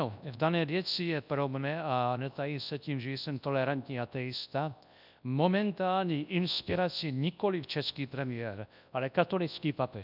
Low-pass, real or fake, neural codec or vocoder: 5.4 kHz; fake; codec, 24 kHz, 0.9 kbps, WavTokenizer, large speech release